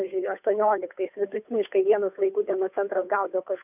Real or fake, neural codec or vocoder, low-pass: fake; codec, 16 kHz, 2 kbps, FunCodec, trained on Chinese and English, 25 frames a second; 3.6 kHz